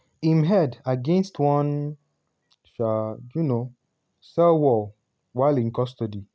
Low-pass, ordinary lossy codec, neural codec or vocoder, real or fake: none; none; none; real